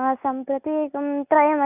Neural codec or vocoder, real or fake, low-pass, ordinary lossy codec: none; real; 3.6 kHz; none